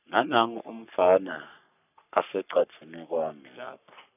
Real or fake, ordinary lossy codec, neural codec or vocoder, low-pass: fake; none; codec, 44.1 kHz, 3.4 kbps, Pupu-Codec; 3.6 kHz